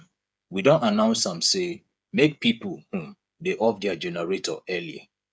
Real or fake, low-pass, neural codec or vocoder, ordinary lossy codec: fake; none; codec, 16 kHz, 8 kbps, FreqCodec, smaller model; none